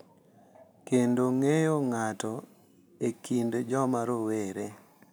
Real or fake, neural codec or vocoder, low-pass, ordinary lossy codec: real; none; none; none